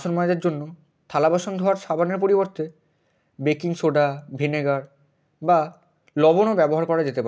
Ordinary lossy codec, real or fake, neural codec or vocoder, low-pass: none; real; none; none